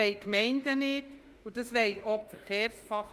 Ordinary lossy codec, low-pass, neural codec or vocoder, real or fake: Opus, 32 kbps; 14.4 kHz; autoencoder, 48 kHz, 32 numbers a frame, DAC-VAE, trained on Japanese speech; fake